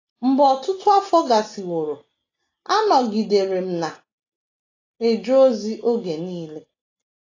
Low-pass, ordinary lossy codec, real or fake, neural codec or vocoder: 7.2 kHz; AAC, 32 kbps; real; none